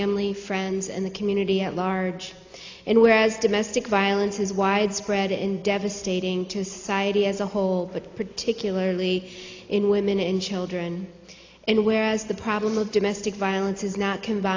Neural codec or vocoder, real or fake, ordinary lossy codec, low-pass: none; real; AAC, 48 kbps; 7.2 kHz